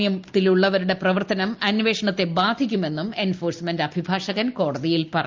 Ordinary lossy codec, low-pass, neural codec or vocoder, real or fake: Opus, 32 kbps; 7.2 kHz; none; real